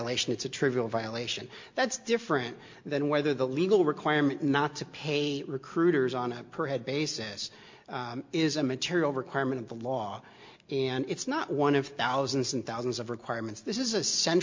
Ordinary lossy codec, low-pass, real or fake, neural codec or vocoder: MP3, 48 kbps; 7.2 kHz; real; none